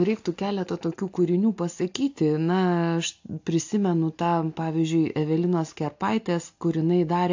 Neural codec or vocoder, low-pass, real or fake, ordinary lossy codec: none; 7.2 kHz; real; AAC, 48 kbps